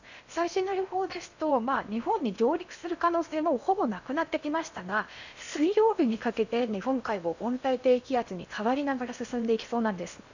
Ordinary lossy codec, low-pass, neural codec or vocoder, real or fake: none; 7.2 kHz; codec, 16 kHz in and 24 kHz out, 0.8 kbps, FocalCodec, streaming, 65536 codes; fake